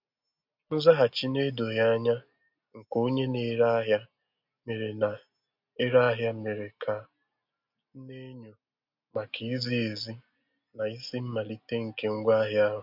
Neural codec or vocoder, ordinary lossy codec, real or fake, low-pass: none; MP3, 48 kbps; real; 5.4 kHz